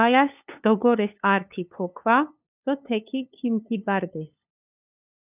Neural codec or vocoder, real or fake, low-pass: codec, 16 kHz, 2 kbps, FunCodec, trained on LibriTTS, 25 frames a second; fake; 3.6 kHz